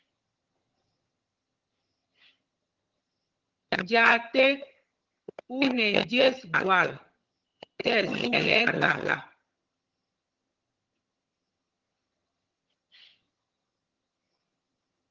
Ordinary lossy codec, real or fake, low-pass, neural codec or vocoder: Opus, 16 kbps; fake; 7.2 kHz; vocoder, 22.05 kHz, 80 mel bands, HiFi-GAN